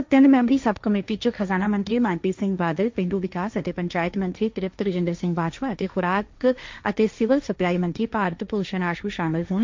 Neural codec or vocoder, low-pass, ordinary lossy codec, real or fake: codec, 16 kHz, 1.1 kbps, Voila-Tokenizer; 7.2 kHz; MP3, 64 kbps; fake